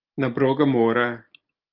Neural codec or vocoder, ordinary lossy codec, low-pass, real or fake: none; Opus, 24 kbps; 5.4 kHz; real